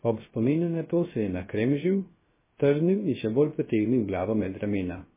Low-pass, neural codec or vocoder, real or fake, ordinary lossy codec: 3.6 kHz; codec, 16 kHz, 0.3 kbps, FocalCodec; fake; MP3, 16 kbps